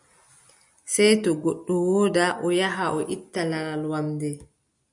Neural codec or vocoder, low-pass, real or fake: none; 10.8 kHz; real